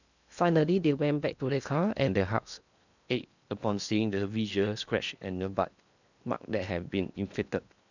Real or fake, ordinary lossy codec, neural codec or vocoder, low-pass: fake; none; codec, 16 kHz in and 24 kHz out, 0.8 kbps, FocalCodec, streaming, 65536 codes; 7.2 kHz